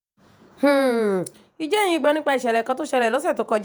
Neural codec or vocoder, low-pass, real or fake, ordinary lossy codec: vocoder, 48 kHz, 128 mel bands, Vocos; none; fake; none